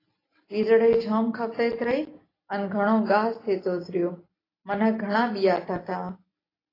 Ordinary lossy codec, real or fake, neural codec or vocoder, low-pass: AAC, 24 kbps; real; none; 5.4 kHz